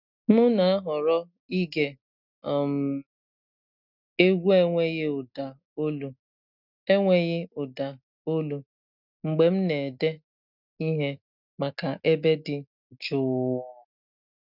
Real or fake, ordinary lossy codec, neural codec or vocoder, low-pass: real; none; none; 5.4 kHz